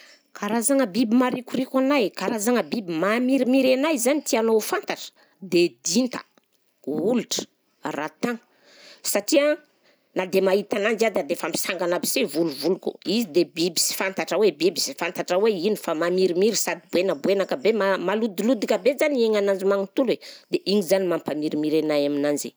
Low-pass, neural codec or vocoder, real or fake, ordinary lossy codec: none; none; real; none